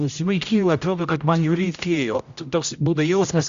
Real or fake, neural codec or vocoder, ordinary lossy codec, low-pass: fake; codec, 16 kHz, 0.5 kbps, X-Codec, HuBERT features, trained on general audio; Opus, 64 kbps; 7.2 kHz